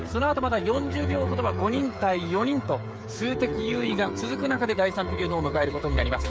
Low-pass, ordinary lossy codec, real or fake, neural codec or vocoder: none; none; fake; codec, 16 kHz, 8 kbps, FreqCodec, smaller model